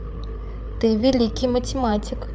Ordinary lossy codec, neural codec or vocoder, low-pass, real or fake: none; codec, 16 kHz, 8 kbps, FreqCodec, larger model; none; fake